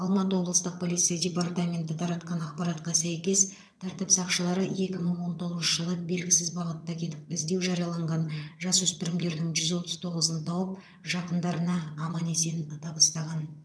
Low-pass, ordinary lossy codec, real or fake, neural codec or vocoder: none; none; fake; vocoder, 22.05 kHz, 80 mel bands, HiFi-GAN